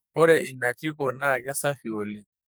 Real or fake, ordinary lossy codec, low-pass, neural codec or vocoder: fake; none; none; codec, 44.1 kHz, 2.6 kbps, SNAC